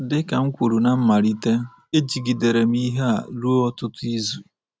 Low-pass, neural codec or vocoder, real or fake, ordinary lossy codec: none; none; real; none